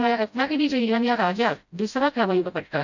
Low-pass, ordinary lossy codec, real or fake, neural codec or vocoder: 7.2 kHz; none; fake; codec, 16 kHz, 0.5 kbps, FreqCodec, smaller model